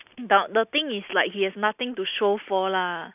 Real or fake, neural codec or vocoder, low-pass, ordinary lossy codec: real; none; 3.6 kHz; none